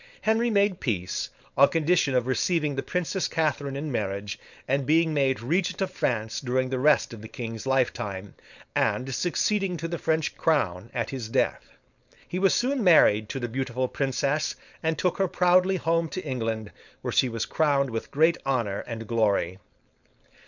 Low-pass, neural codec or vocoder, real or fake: 7.2 kHz; codec, 16 kHz, 4.8 kbps, FACodec; fake